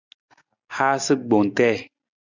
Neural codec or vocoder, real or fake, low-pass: none; real; 7.2 kHz